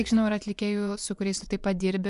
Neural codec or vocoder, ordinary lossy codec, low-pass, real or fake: vocoder, 24 kHz, 100 mel bands, Vocos; MP3, 96 kbps; 10.8 kHz; fake